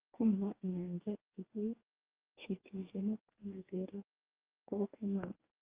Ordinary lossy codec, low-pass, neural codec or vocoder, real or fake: Opus, 24 kbps; 3.6 kHz; codec, 44.1 kHz, 2.6 kbps, DAC; fake